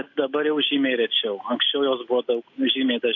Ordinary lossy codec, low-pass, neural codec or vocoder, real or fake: AAC, 48 kbps; 7.2 kHz; none; real